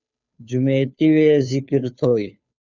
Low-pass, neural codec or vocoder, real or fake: 7.2 kHz; codec, 16 kHz, 2 kbps, FunCodec, trained on Chinese and English, 25 frames a second; fake